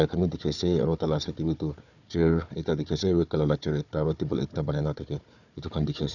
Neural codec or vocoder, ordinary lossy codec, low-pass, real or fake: codec, 16 kHz, 4 kbps, FunCodec, trained on Chinese and English, 50 frames a second; none; 7.2 kHz; fake